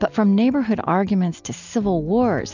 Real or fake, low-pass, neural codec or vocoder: real; 7.2 kHz; none